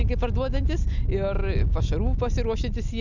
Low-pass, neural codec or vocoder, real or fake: 7.2 kHz; none; real